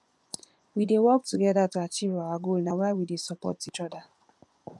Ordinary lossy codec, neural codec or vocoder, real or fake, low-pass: none; vocoder, 24 kHz, 100 mel bands, Vocos; fake; none